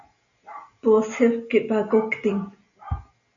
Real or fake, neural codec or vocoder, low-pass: real; none; 7.2 kHz